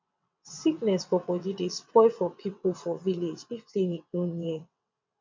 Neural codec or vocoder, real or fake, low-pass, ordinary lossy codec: vocoder, 22.05 kHz, 80 mel bands, WaveNeXt; fake; 7.2 kHz; MP3, 64 kbps